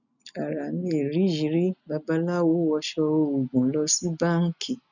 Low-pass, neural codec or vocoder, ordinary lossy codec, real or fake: 7.2 kHz; none; none; real